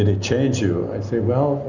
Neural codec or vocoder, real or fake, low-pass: none; real; 7.2 kHz